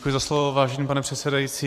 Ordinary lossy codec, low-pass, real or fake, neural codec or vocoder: AAC, 96 kbps; 14.4 kHz; fake; vocoder, 44.1 kHz, 128 mel bands every 512 samples, BigVGAN v2